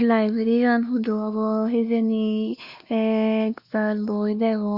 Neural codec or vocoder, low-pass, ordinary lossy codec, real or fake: codec, 16 kHz, 2 kbps, X-Codec, WavLM features, trained on Multilingual LibriSpeech; 5.4 kHz; Opus, 64 kbps; fake